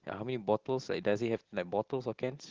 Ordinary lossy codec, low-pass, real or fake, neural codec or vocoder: Opus, 16 kbps; 7.2 kHz; real; none